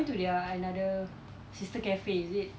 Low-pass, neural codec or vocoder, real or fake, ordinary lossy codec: none; none; real; none